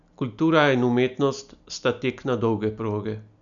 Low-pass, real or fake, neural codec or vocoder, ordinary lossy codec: 7.2 kHz; real; none; none